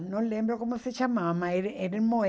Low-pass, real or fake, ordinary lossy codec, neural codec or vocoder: none; real; none; none